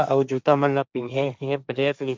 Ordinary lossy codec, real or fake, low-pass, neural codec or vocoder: none; fake; none; codec, 16 kHz, 1.1 kbps, Voila-Tokenizer